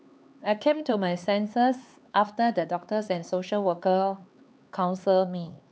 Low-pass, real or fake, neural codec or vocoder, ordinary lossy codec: none; fake; codec, 16 kHz, 4 kbps, X-Codec, HuBERT features, trained on LibriSpeech; none